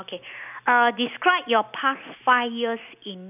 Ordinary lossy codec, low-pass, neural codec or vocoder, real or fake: none; 3.6 kHz; none; real